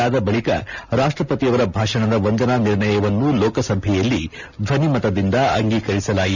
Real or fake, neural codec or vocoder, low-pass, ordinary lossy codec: real; none; 7.2 kHz; none